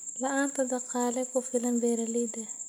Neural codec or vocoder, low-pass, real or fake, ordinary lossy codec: none; none; real; none